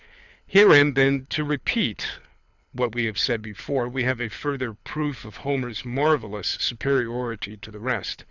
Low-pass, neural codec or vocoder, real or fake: 7.2 kHz; codec, 24 kHz, 6 kbps, HILCodec; fake